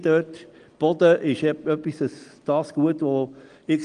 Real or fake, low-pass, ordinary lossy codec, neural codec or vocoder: real; 9.9 kHz; Opus, 24 kbps; none